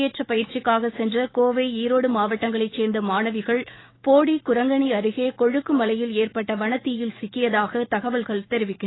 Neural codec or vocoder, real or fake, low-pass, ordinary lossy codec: autoencoder, 48 kHz, 128 numbers a frame, DAC-VAE, trained on Japanese speech; fake; 7.2 kHz; AAC, 16 kbps